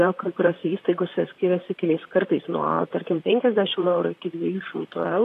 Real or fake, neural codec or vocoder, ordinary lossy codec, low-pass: fake; vocoder, 44.1 kHz, 128 mel bands, Pupu-Vocoder; AAC, 48 kbps; 14.4 kHz